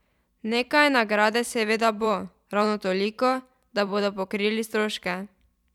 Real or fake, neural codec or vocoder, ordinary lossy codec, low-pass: fake; vocoder, 44.1 kHz, 128 mel bands every 512 samples, BigVGAN v2; none; 19.8 kHz